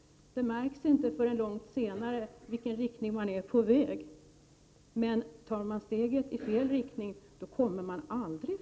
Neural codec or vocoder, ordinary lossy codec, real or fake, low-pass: none; none; real; none